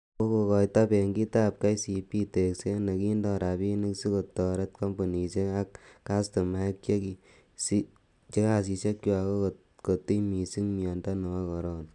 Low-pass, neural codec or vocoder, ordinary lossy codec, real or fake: 10.8 kHz; none; none; real